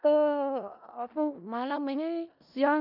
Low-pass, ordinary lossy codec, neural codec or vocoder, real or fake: 5.4 kHz; none; codec, 16 kHz in and 24 kHz out, 0.4 kbps, LongCat-Audio-Codec, four codebook decoder; fake